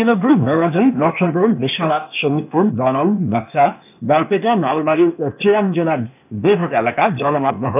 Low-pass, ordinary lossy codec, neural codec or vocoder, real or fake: 3.6 kHz; none; codec, 16 kHz, 0.8 kbps, ZipCodec; fake